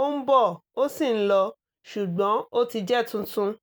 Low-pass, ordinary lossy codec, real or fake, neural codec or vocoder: none; none; real; none